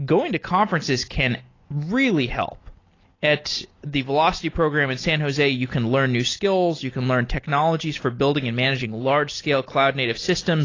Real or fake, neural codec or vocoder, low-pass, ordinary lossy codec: real; none; 7.2 kHz; AAC, 32 kbps